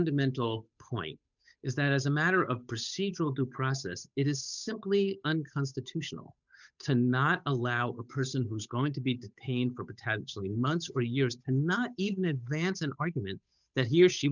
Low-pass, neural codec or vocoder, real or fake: 7.2 kHz; codec, 16 kHz, 8 kbps, FunCodec, trained on Chinese and English, 25 frames a second; fake